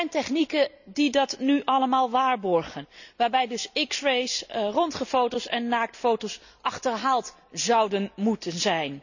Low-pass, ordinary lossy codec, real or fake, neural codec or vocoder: 7.2 kHz; none; real; none